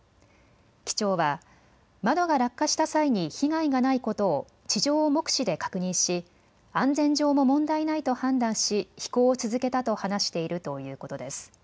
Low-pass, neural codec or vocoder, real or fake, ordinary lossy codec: none; none; real; none